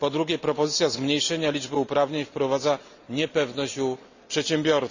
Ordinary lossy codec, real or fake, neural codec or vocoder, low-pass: none; real; none; 7.2 kHz